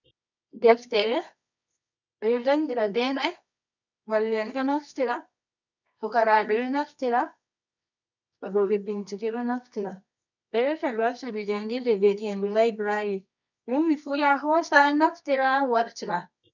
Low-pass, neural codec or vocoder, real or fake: 7.2 kHz; codec, 24 kHz, 0.9 kbps, WavTokenizer, medium music audio release; fake